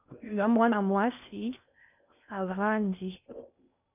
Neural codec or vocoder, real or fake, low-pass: codec, 16 kHz in and 24 kHz out, 0.6 kbps, FocalCodec, streaming, 4096 codes; fake; 3.6 kHz